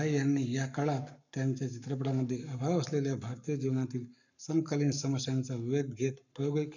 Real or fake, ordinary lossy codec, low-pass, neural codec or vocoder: fake; none; 7.2 kHz; codec, 44.1 kHz, 7.8 kbps, Pupu-Codec